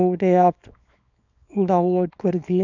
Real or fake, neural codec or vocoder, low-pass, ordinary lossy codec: fake; codec, 24 kHz, 0.9 kbps, WavTokenizer, small release; 7.2 kHz; none